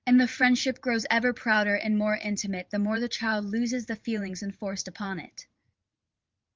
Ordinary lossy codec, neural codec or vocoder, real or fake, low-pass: Opus, 32 kbps; vocoder, 44.1 kHz, 128 mel bands every 512 samples, BigVGAN v2; fake; 7.2 kHz